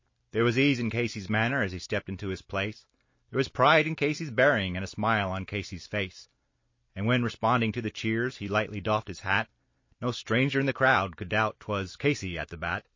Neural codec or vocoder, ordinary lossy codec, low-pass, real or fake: none; MP3, 32 kbps; 7.2 kHz; real